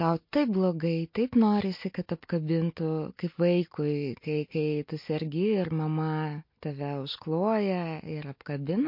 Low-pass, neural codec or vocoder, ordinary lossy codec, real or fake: 5.4 kHz; none; MP3, 32 kbps; real